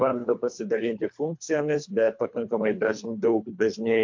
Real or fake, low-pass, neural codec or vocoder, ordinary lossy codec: fake; 7.2 kHz; codec, 24 kHz, 3 kbps, HILCodec; MP3, 48 kbps